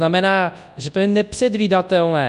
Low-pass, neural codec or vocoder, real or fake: 10.8 kHz; codec, 24 kHz, 0.9 kbps, WavTokenizer, large speech release; fake